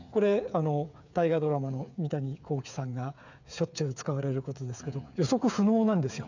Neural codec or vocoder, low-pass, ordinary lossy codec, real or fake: codec, 16 kHz, 8 kbps, FreqCodec, smaller model; 7.2 kHz; none; fake